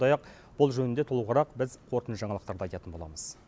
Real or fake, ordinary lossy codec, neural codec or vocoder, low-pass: real; none; none; none